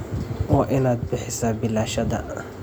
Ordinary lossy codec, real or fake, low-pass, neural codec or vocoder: none; real; none; none